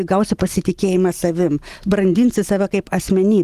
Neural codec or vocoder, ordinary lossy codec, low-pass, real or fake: none; Opus, 24 kbps; 14.4 kHz; real